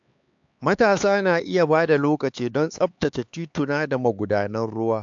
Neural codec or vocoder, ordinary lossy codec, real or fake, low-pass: codec, 16 kHz, 4 kbps, X-Codec, HuBERT features, trained on LibriSpeech; AAC, 64 kbps; fake; 7.2 kHz